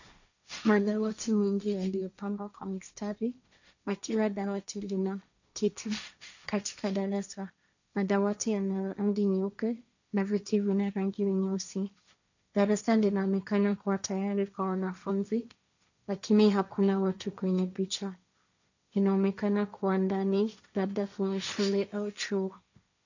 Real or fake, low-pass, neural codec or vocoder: fake; 7.2 kHz; codec, 16 kHz, 1.1 kbps, Voila-Tokenizer